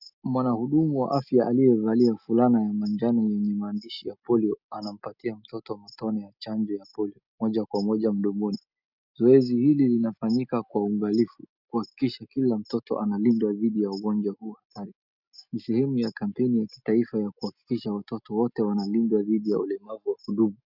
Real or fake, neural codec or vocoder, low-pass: real; none; 5.4 kHz